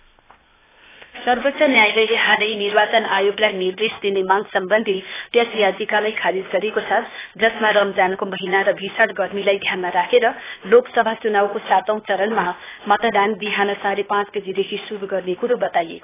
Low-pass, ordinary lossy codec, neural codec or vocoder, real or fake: 3.6 kHz; AAC, 16 kbps; codec, 16 kHz, 0.8 kbps, ZipCodec; fake